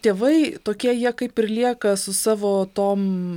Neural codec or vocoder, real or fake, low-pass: none; real; 19.8 kHz